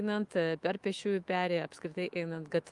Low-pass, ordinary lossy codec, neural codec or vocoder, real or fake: 10.8 kHz; Opus, 32 kbps; autoencoder, 48 kHz, 128 numbers a frame, DAC-VAE, trained on Japanese speech; fake